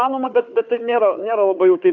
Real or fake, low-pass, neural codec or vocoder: fake; 7.2 kHz; codec, 16 kHz, 4 kbps, FunCodec, trained on Chinese and English, 50 frames a second